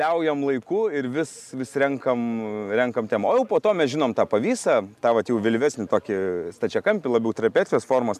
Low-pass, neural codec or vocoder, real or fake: 14.4 kHz; none; real